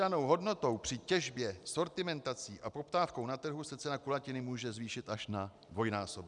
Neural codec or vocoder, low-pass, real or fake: none; 10.8 kHz; real